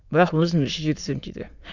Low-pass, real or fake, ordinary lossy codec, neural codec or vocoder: 7.2 kHz; fake; none; autoencoder, 22.05 kHz, a latent of 192 numbers a frame, VITS, trained on many speakers